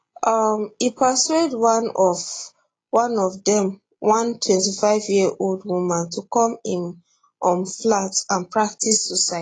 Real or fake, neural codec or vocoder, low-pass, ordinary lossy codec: real; none; 9.9 kHz; AAC, 32 kbps